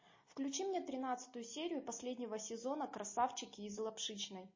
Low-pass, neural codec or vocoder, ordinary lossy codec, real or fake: 7.2 kHz; none; MP3, 32 kbps; real